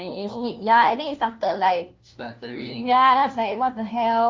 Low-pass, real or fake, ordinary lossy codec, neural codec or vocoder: 7.2 kHz; fake; Opus, 32 kbps; codec, 16 kHz, 1 kbps, FunCodec, trained on LibriTTS, 50 frames a second